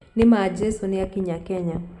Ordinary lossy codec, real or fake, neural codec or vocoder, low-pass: none; real; none; 10.8 kHz